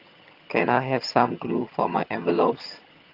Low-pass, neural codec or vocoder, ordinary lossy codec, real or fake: 5.4 kHz; vocoder, 22.05 kHz, 80 mel bands, HiFi-GAN; Opus, 32 kbps; fake